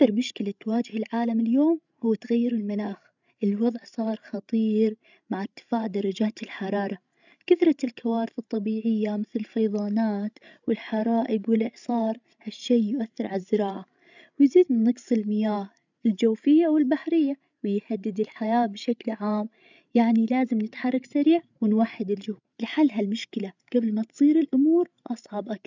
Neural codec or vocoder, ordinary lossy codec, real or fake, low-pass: codec, 16 kHz, 16 kbps, FreqCodec, larger model; none; fake; 7.2 kHz